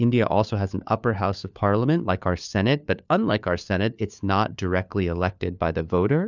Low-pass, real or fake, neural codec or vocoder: 7.2 kHz; fake; codec, 16 kHz, 4 kbps, FunCodec, trained on LibriTTS, 50 frames a second